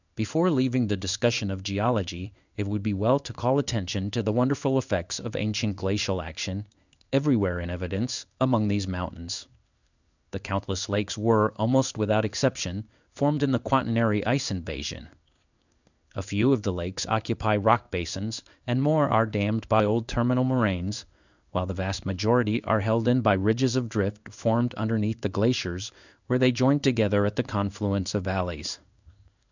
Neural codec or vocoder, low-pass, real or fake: codec, 16 kHz in and 24 kHz out, 1 kbps, XY-Tokenizer; 7.2 kHz; fake